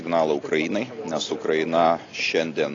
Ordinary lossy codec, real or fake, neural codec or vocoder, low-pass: AAC, 32 kbps; real; none; 7.2 kHz